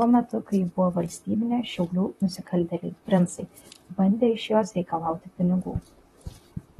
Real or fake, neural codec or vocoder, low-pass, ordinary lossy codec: fake; vocoder, 44.1 kHz, 128 mel bands, Pupu-Vocoder; 19.8 kHz; AAC, 32 kbps